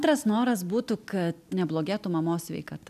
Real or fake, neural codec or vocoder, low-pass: real; none; 14.4 kHz